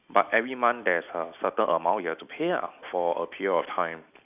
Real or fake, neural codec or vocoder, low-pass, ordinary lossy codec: real; none; 3.6 kHz; none